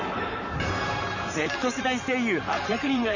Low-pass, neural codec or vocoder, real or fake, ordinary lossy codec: 7.2 kHz; vocoder, 44.1 kHz, 128 mel bands, Pupu-Vocoder; fake; none